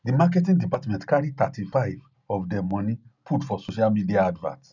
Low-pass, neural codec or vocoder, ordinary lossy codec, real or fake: 7.2 kHz; none; none; real